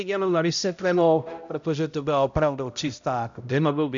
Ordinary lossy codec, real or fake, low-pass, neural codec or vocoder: MP3, 64 kbps; fake; 7.2 kHz; codec, 16 kHz, 0.5 kbps, X-Codec, HuBERT features, trained on balanced general audio